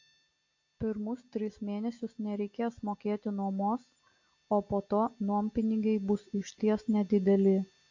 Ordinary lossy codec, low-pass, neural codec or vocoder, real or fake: AAC, 48 kbps; 7.2 kHz; none; real